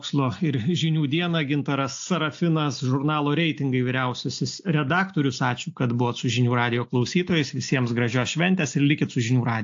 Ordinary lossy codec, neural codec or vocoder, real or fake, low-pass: AAC, 48 kbps; none; real; 7.2 kHz